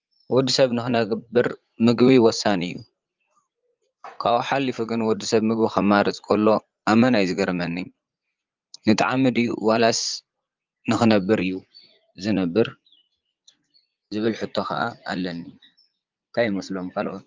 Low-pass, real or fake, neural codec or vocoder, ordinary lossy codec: 7.2 kHz; fake; vocoder, 44.1 kHz, 80 mel bands, Vocos; Opus, 32 kbps